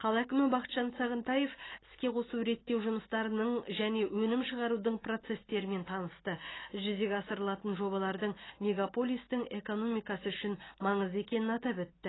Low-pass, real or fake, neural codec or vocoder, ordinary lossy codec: 7.2 kHz; real; none; AAC, 16 kbps